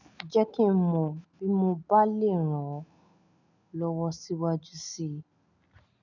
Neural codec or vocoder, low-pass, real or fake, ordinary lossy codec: none; 7.2 kHz; real; none